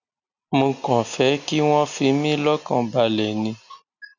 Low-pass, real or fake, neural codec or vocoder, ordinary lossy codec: 7.2 kHz; real; none; none